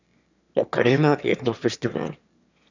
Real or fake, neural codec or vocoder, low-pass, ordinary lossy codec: fake; autoencoder, 22.05 kHz, a latent of 192 numbers a frame, VITS, trained on one speaker; 7.2 kHz; none